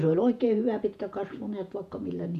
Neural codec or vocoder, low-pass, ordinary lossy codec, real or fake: vocoder, 48 kHz, 128 mel bands, Vocos; 14.4 kHz; none; fake